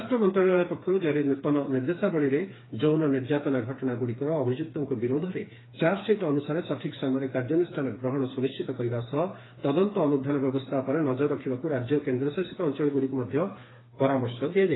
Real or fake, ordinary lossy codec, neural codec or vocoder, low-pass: fake; AAC, 16 kbps; codec, 16 kHz, 4 kbps, FreqCodec, smaller model; 7.2 kHz